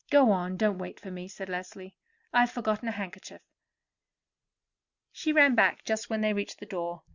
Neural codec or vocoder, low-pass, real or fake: none; 7.2 kHz; real